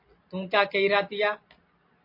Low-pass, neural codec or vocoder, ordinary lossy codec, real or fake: 5.4 kHz; none; MP3, 32 kbps; real